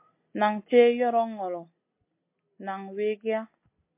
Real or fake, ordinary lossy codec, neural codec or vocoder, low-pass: real; MP3, 24 kbps; none; 3.6 kHz